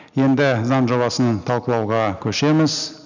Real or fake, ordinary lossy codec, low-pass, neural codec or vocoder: real; none; 7.2 kHz; none